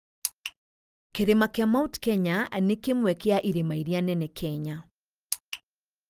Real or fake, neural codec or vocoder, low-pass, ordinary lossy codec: real; none; 14.4 kHz; Opus, 32 kbps